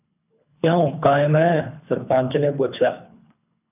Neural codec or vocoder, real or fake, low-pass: codec, 24 kHz, 3 kbps, HILCodec; fake; 3.6 kHz